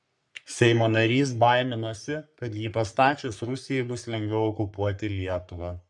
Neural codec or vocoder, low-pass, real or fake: codec, 44.1 kHz, 3.4 kbps, Pupu-Codec; 10.8 kHz; fake